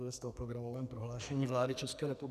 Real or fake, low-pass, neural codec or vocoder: fake; 14.4 kHz; codec, 44.1 kHz, 2.6 kbps, SNAC